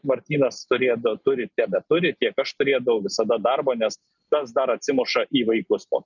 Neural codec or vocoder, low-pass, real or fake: none; 7.2 kHz; real